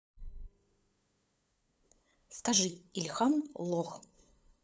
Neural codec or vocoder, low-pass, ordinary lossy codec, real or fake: codec, 16 kHz, 8 kbps, FunCodec, trained on LibriTTS, 25 frames a second; none; none; fake